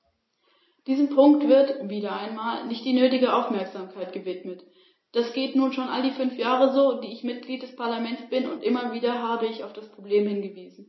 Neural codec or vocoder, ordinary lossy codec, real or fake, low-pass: none; MP3, 24 kbps; real; 7.2 kHz